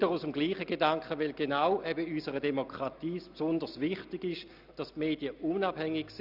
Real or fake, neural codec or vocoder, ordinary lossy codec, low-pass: real; none; none; 5.4 kHz